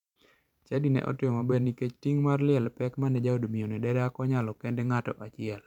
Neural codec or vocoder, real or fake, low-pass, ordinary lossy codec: vocoder, 44.1 kHz, 128 mel bands every 512 samples, BigVGAN v2; fake; 19.8 kHz; none